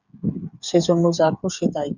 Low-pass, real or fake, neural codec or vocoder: 7.2 kHz; fake; codec, 16 kHz, 8 kbps, FreqCodec, smaller model